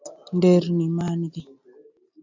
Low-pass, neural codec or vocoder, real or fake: 7.2 kHz; none; real